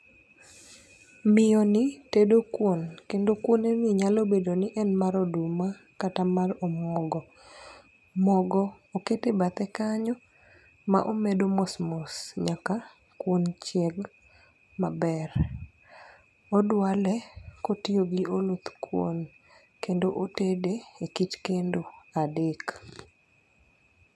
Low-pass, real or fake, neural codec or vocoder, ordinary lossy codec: none; real; none; none